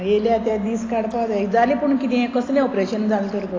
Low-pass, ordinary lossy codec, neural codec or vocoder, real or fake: 7.2 kHz; AAC, 32 kbps; none; real